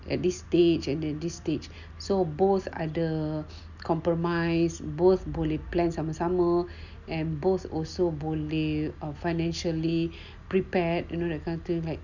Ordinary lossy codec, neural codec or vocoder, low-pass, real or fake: none; none; 7.2 kHz; real